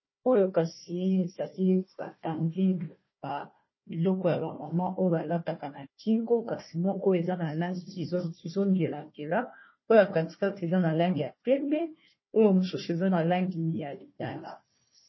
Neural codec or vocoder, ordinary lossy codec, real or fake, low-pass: codec, 16 kHz, 1 kbps, FunCodec, trained on Chinese and English, 50 frames a second; MP3, 24 kbps; fake; 7.2 kHz